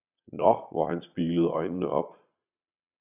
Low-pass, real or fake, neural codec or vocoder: 3.6 kHz; fake; vocoder, 22.05 kHz, 80 mel bands, Vocos